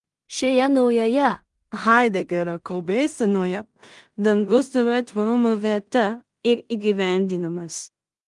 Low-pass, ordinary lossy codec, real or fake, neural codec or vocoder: 10.8 kHz; Opus, 32 kbps; fake; codec, 16 kHz in and 24 kHz out, 0.4 kbps, LongCat-Audio-Codec, two codebook decoder